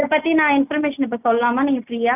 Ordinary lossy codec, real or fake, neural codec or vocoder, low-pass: none; real; none; 3.6 kHz